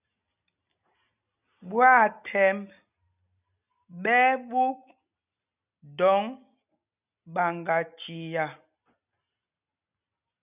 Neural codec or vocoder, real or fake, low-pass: none; real; 3.6 kHz